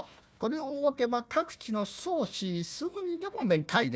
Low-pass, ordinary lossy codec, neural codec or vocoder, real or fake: none; none; codec, 16 kHz, 1 kbps, FunCodec, trained on Chinese and English, 50 frames a second; fake